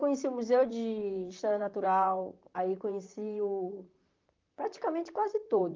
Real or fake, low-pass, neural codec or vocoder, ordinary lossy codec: fake; 7.2 kHz; vocoder, 44.1 kHz, 128 mel bands, Pupu-Vocoder; Opus, 24 kbps